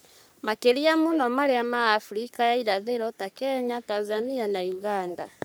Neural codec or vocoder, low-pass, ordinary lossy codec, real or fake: codec, 44.1 kHz, 3.4 kbps, Pupu-Codec; none; none; fake